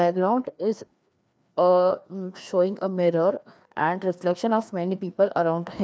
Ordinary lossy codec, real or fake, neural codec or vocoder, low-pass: none; fake; codec, 16 kHz, 2 kbps, FreqCodec, larger model; none